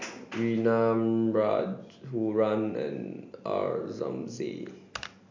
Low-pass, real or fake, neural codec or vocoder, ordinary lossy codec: 7.2 kHz; real; none; MP3, 64 kbps